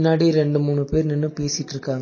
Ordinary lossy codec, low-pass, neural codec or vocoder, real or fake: MP3, 32 kbps; 7.2 kHz; none; real